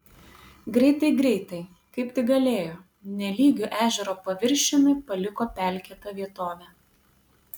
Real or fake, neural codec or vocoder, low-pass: real; none; 19.8 kHz